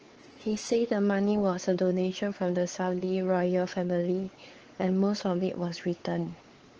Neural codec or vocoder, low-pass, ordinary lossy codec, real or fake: codec, 16 kHz, 4 kbps, X-Codec, WavLM features, trained on Multilingual LibriSpeech; 7.2 kHz; Opus, 16 kbps; fake